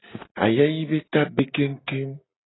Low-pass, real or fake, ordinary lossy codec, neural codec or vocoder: 7.2 kHz; real; AAC, 16 kbps; none